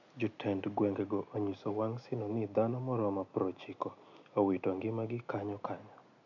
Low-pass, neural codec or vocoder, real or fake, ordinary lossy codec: 7.2 kHz; none; real; none